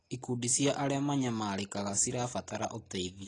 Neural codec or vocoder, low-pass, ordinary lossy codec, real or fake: none; 10.8 kHz; AAC, 32 kbps; real